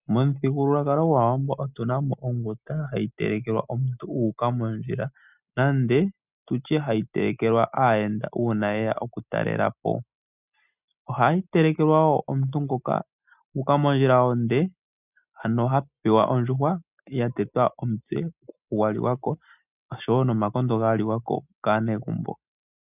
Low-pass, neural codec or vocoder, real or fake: 3.6 kHz; none; real